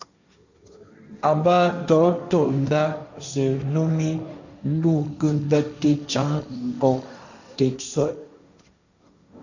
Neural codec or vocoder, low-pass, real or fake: codec, 16 kHz, 1.1 kbps, Voila-Tokenizer; 7.2 kHz; fake